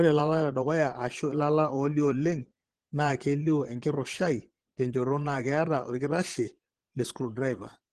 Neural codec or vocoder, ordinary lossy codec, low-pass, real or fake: vocoder, 22.05 kHz, 80 mel bands, Vocos; Opus, 16 kbps; 9.9 kHz; fake